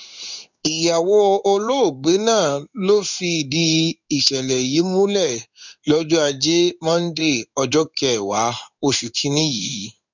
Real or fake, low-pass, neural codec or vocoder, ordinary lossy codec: fake; 7.2 kHz; codec, 16 kHz in and 24 kHz out, 1 kbps, XY-Tokenizer; none